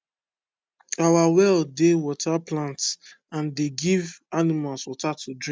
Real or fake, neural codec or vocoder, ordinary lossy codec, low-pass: real; none; none; none